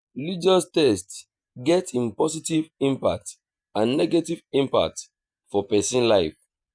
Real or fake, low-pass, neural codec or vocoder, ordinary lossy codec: fake; 9.9 kHz; vocoder, 48 kHz, 128 mel bands, Vocos; none